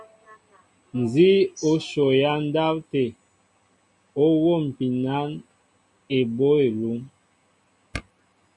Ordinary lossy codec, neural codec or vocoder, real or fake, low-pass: MP3, 96 kbps; none; real; 10.8 kHz